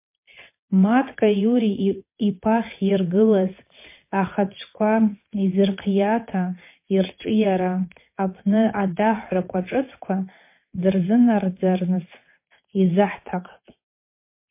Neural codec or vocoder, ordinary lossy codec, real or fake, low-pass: vocoder, 22.05 kHz, 80 mel bands, WaveNeXt; MP3, 24 kbps; fake; 3.6 kHz